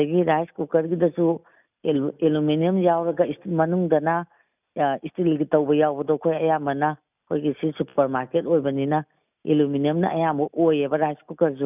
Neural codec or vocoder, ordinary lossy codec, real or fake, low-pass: none; none; real; 3.6 kHz